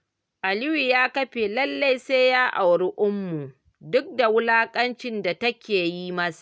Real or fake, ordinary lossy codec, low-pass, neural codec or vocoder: real; none; none; none